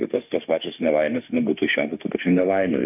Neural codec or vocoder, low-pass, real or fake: autoencoder, 48 kHz, 32 numbers a frame, DAC-VAE, trained on Japanese speech; 3.6 kHz; fake